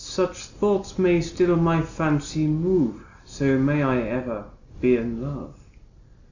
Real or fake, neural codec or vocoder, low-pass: real; none; 7.2 kHz